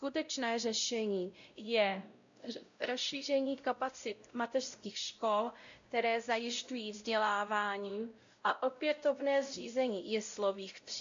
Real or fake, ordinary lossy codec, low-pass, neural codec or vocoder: fake; AAC, 64 kbps; 7.2 kHz; codec, 16 kHz, 0.5 kbps, X-Codec, WavLM features, trained on Multilingual LibriSpeech